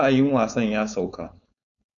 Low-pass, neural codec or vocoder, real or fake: 7.2 kHz; codec, 16 kHz, 4.8 kbps, FACodec; fake